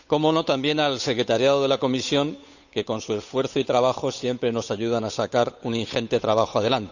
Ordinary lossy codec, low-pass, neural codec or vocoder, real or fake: none; 7.2 kHz; codec, 16 kHz, 8 kbps, FunCodec, trained on Chinese and English, 25 frames a second; fake